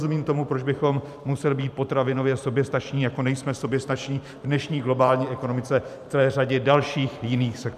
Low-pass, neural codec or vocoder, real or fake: 14.4 kHz; vocoder, 44.1 kHz, 128 mel bands every 512 samples, BigVGAN v2; fake